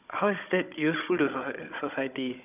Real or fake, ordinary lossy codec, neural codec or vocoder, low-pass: fake; none; codec, 16 kHz, 16 kbps, FunCodec, trained on Chinese and English, 50 frames a second; 3.6 kHz